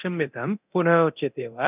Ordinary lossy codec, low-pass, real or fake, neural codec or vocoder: none; 3.6 kHz; fake; codec, 24 kHz, 0.9 kbps, DualCodec